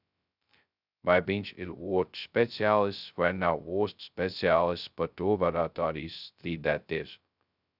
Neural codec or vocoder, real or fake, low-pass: codec, 16 kHz, 0.2 kbps, FocalCodec; fake; 5.4 kHz